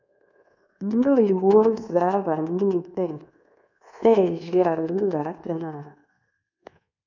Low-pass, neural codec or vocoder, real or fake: 7.2 kHz; codec, 24 kHz, 1.2 kbps, DualCodec; fake